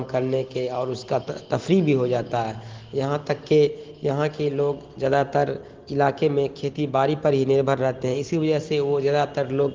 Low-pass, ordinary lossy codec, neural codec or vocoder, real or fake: 7.2 kHz; Opus, 16 kbps; none; real